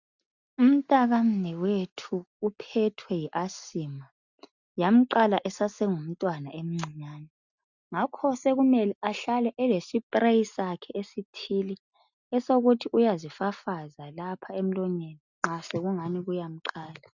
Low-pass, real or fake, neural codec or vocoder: 7.2 kHz; real; none